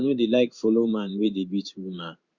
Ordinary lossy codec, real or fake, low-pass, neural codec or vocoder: Opus, 64 kbps; fake; 7.2 kHz; codec, 16 kHz in and 24 kHz out, 1 kbps, XY-Tokenizer